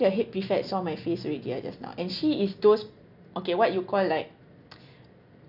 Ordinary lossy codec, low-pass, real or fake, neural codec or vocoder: none; 5.4 kHz; real; none